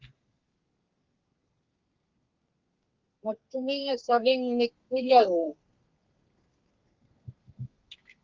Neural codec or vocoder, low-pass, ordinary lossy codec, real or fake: codec, 32 kHz, 1.9 kbps, SNAC; 7.2 kHz; Opus, 32 kbps; fake